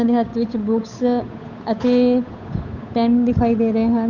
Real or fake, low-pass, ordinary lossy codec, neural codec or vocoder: fake; 7.2 kHz; none; codec, 16 kHz, 8 kbps, FunCodec, trained on Chinese and English, 25 frames a second